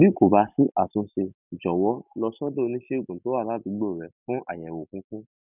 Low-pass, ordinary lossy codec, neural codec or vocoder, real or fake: 3.6 kHz; none; none; real